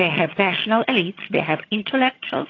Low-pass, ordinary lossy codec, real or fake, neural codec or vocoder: 7.2 kHz; AAC, 32 kbps; fake; vocoder, 22.05 kHz, 80 mel bands, HiFi-GAN